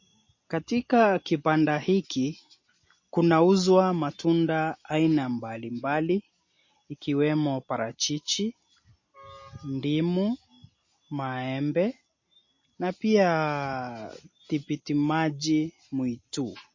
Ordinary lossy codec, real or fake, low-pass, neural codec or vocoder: MP3, 32 kbps; real; 7.2 kHz; none